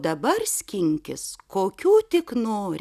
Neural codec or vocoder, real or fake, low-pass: none; real; 14.4 kHz